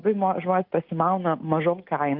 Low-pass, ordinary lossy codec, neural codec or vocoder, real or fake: 5.4 kHz; Opus, 24 kbps; none; real